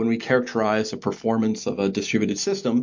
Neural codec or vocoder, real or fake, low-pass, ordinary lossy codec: none; real; 7.2 kHz; MP3, 48 kbps